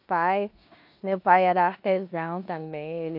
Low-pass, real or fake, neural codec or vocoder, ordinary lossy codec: 5.4 kHz; fake; codec, 16 kHz in and 24 kHz out, 0.9 kbps, LongCat-Audio-Codec, four codebook decoder; none